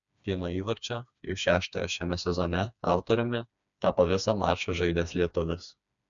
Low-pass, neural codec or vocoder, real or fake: 7.2 kHz; codec, 16 kHz, 2 kbps, FreqCodec, smaller model; fake